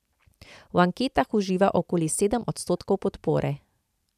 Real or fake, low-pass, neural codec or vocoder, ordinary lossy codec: fake; 14.4 kHz; vocoder, 44.1 kHz, 128 mel bands every 512 samples, BigVGAN v2; none